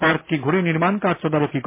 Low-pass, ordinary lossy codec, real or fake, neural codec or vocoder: 3.6 kHz; MP3, 24 kbps; real; none